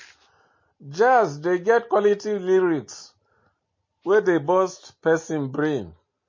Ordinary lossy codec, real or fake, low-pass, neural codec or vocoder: MP3, 32 kbps; real; 7.2 kHz; none